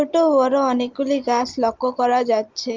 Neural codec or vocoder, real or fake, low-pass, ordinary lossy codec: none; real; 7.2 kHz; Opus, 24 kbps